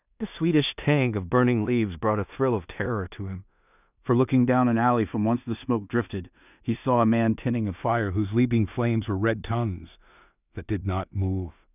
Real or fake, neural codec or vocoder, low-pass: fake; codec, 16 kHz in and 24 kHz out, 0.4 kbps, LongCat-Audio-Codec, two codebook decoder; 3.6 kHz